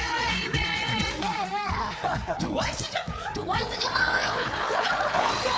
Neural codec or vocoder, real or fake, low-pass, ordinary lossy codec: codec, 16 kHz, 4 kbps, FreqCodec, larger model; fake; none; none